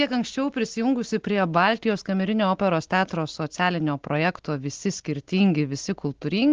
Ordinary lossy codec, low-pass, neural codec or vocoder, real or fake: Opus, 16 kbps; 7.2 kHz; none; real